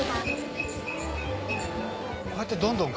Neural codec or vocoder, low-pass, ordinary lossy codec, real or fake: none; none; none; real